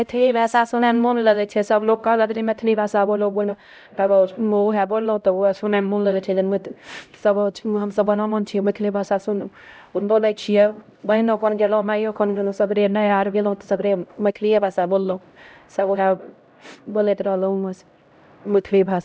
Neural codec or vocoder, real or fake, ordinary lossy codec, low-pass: codec, 16 kHz, 0.5 kbps, X-Codec, HuBERT features, trained on LibriSpeech; fake; none; none